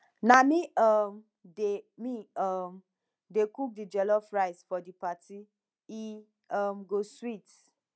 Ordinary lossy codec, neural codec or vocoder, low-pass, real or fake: none; none; none; real